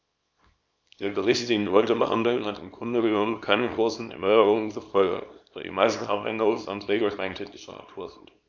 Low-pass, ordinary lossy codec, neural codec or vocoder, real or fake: 7.2 kHz; none; codec, 24 kHz, 0.9 kbps, WavTokenizer, small release; fake